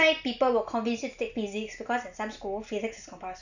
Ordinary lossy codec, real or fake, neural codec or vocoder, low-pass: none; real; none; 7.2 kHz